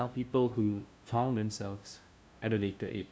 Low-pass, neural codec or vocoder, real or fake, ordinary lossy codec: none; codec, 16 kHz, 0.5 kbps, FunCodec, trained on LibriTTS, 25 frames a second; fake; none